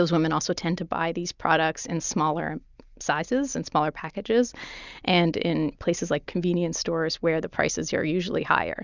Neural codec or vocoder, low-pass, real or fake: none; 7.2 kHz; real